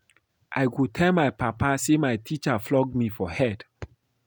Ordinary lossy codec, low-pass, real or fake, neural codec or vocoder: none; none; real; none